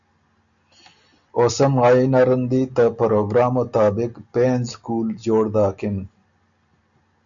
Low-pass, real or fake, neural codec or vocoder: 7.2 kHz; real; none